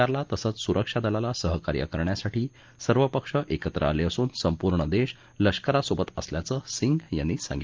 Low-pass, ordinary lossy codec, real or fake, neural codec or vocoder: 7.2 kHz; Opus, 32 kbps; real; none